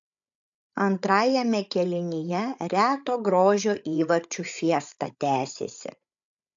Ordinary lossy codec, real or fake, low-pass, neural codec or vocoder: AAC, 64 kbps; fake; 7.2 kHz; codec, 16 kHz, 8 kbps, FreqCodec, larger model